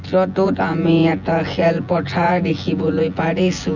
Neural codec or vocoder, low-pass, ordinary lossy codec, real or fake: vocoder, 24 kHz, 100 mel bands, Vocos; 7.2 kHz; none; fake